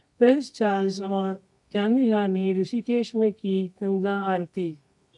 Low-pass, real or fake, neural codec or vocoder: 10.8 kHz; fake; codec, 24 kHz, 0.9 kbps, WavTokenizer, medium music audio release